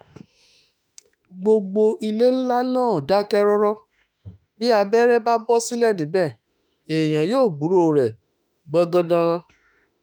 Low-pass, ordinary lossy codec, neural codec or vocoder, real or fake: none; none; autoencoder, 48 kHz, 32 numbers a frame, DAC-VAE, trained on Japanese speech; fake